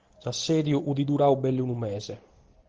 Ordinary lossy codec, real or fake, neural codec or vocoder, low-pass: Opus, 16 kbps; real; none; 7.2 kHz